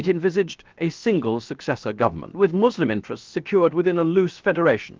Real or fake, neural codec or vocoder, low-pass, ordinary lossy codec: fake; codec, 16 kHz, about 1 kbps, DyCAST, with the encoder's durations; 7.2 kHz; Opus, 24 kbps